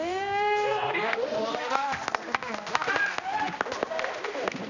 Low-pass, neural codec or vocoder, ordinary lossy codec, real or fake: 7.2 kHz; codec, 16 kHz, 1 kbps, X-Codec, HuBERT features, trained on balanced general audio; none; fake